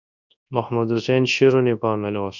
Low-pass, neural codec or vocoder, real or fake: 7.2 kHz; codec, 24 kHz, 0.9 kbps, WavTokenizer, large speech release; fake